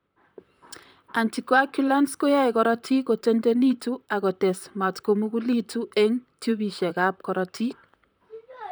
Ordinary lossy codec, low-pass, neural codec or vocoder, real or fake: none; none; vocoder, 44.1 kHz, 128 mel bands, Pupu-Vocoder; fake